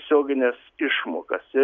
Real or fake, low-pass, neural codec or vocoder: real; 7.2 kHz; none